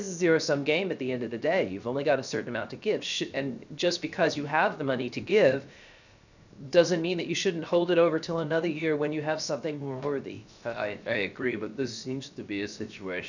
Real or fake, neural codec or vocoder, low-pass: fake; codec, 16 kHz, 0.7 kbps, FocalCodec; 7.2 kHz